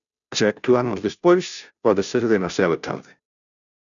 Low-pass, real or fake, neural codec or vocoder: 7.2 kHz; fake; codec, 16 kHz, 0.5 kbps, FunCodec, trained on Chinese and English, 25 frames a second